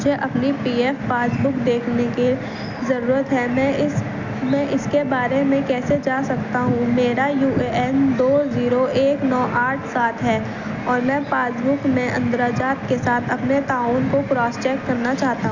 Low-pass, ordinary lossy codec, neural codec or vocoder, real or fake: 7.2 kHz; none; none; real